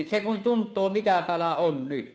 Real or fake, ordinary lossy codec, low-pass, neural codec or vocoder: fake; none; none; codec, 16 kHz, 2 kbps, FunCodec, trained on Chinese and English, 25 frames a second